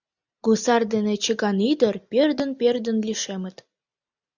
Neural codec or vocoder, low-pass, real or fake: none; 7.2 kHz; real